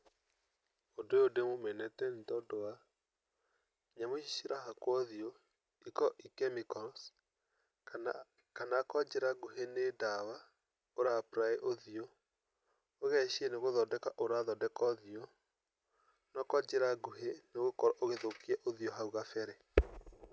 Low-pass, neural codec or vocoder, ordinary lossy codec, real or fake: none; none; none; real